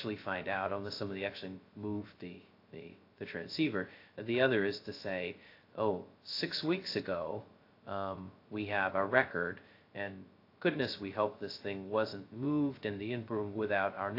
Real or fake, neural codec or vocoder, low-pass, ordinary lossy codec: fake; codec, 16 kHz, 0.2 kbps, FocalCodec; 5.4 kHz; AAC, 32 kbps